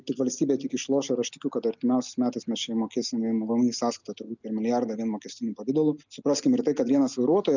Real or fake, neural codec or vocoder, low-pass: real; none; 7.2 kHz